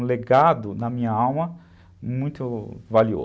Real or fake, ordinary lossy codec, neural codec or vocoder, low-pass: real; none; none; none